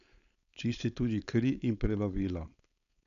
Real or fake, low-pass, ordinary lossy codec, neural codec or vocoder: fake; 7.2 kHz; none; codec, 16 kHz, 4.8 kbps, FACodec